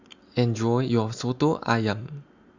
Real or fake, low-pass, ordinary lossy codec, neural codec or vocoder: real; 7.2 kHz; Opus, 64 kbps; none